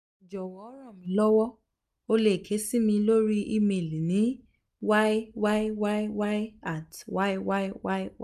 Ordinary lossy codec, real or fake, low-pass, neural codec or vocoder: none; real; 14.4 kHz; none